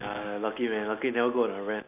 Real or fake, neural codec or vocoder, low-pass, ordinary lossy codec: real; none; 3.6 kHz; none